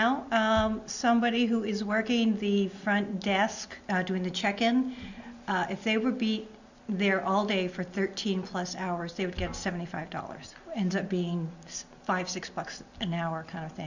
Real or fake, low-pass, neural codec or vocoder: real; 7.2 kHz; none